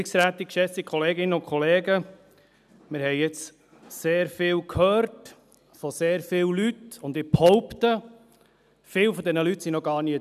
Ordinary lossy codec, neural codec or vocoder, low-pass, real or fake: none; none; 14.4 kHz; real